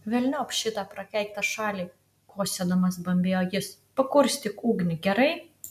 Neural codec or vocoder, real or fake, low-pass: none; real; 14.4 kHz